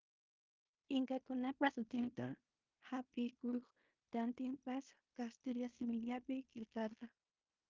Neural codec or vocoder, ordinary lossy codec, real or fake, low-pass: codec, 16 kHz in and 24 kHz out, 0.9 kbps, LongCat-Audio-Codec, fine tuned four codebook decoder; Opus, 24 kbps; fake; 7.2 kHz